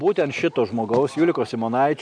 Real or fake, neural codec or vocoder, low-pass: real; none; 9.9 kHz